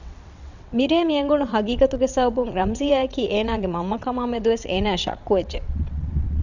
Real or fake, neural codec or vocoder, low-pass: fake; vocoder, 22.05 kHz, 80 mel bands, WaveNeXt; 7.2 kHz